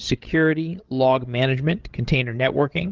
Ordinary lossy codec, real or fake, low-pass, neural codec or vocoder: Opus, 16 kbps; fake; 7.2 kHz; codec, 16 kHz, 16 kbps, FreqCodec, larger model